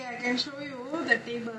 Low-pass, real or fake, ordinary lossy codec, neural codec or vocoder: none; real; none; none